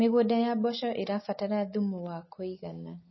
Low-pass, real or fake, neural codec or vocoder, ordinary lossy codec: 7.2 kHz; real; none; MP3, 24 kbps